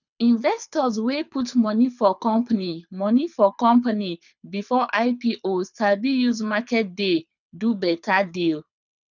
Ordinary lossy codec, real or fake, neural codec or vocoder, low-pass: none; fake; codec, 24 kHz, 6 kbps, HILCodec; 7.2 kHz